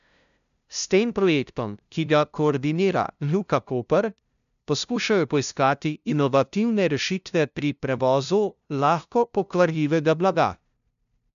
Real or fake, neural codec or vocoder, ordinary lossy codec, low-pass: fake; codec, 16 kHz, 0.5 kbps, FunCodec, trained on LibriTTS, 25 frames a second; none; 7.2 kHz